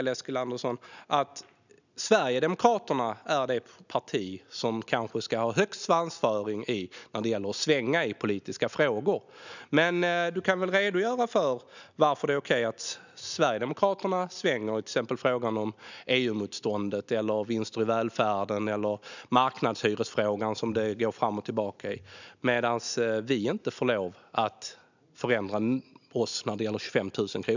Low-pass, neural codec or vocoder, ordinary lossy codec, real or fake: 7.2 kHz; none; none; real